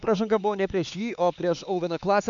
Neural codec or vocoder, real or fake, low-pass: codec, 16 kHz, 4 kbps, X-Codec, HuBERT features, trained on LibriSpeech; fake; 7.2 kHz